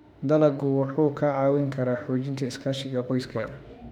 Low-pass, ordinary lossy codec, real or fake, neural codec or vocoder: 19.8 kHz; none; fake; autoencoder, 48 kHz, 32 numbers a frame, DAC-VAE, trained on Japanese speech